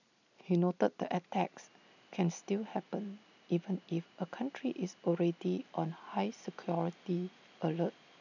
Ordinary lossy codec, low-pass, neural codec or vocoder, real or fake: none; 7.2 kHz; none; real